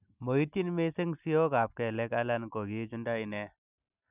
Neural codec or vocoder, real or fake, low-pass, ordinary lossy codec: none; real; 3.6 kHz; none